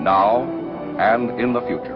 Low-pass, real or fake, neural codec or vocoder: 5.4 kHz; fake; autoencoder, 48 kHz, 128 numbers a frame, DAC-VAE, trained on Japanese speech